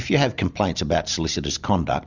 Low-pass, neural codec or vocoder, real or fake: 7.2 kHz; none; real